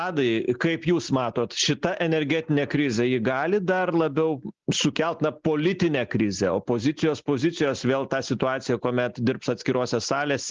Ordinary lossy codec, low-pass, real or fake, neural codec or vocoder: Opus, 32 kbps; 7.2 kHz; real; none